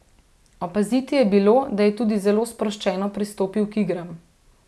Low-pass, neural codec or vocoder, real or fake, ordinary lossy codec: none; none; real; none